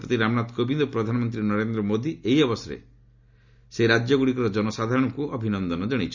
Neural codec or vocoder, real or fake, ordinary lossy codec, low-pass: none; real; none; 7.2 kHz